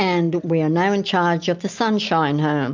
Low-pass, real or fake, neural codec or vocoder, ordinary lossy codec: 7.2 kHz; real; none; MP3, 64 kbps